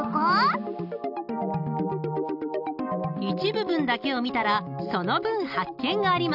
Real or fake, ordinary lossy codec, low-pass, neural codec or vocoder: real; none; 5.4 kHz; none